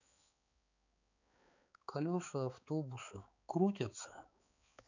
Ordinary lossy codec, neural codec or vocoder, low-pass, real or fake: none; codec, 16 kHz, 4 kbps, X-Codec, HuBERT features, trained on balanced general audio; 7.2 kHz; fake